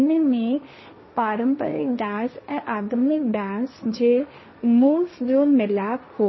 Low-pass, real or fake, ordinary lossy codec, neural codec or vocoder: 7.2 kHz; fake; MP3, 24 kbps; codec, 16 kHz, 1.1 kbps, Voila-Tokenizer